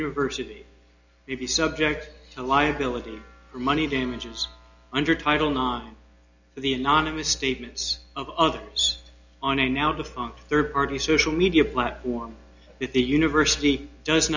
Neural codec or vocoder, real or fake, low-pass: none; real; 7.2 kHz